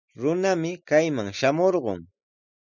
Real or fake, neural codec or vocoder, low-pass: real; none; 7.2 kHz